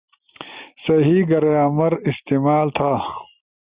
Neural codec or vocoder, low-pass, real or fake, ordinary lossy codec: none; 3.6 kHz; real; Opus, 64 kbps